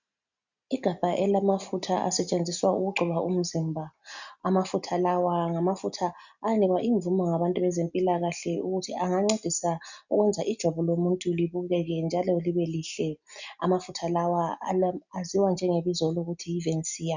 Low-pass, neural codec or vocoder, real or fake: 7.2 kHz; none; real